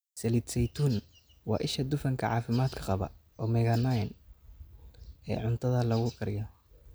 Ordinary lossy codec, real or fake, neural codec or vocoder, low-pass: none; real; none; none